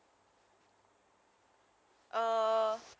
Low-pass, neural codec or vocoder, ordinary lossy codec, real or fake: none; none; none; real